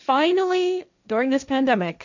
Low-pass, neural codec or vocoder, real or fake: 7.2 kHz; codec, 16 kHz, 1.1 kbps, Voila-Tokenizer; fake